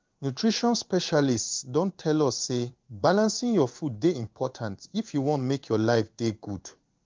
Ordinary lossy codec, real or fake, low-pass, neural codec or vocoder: Opus, 24 kbps; fake; 7.2 kHz; codec, 16 kHz in and 24 kHz out, 1 kbps, XY-Tokenizer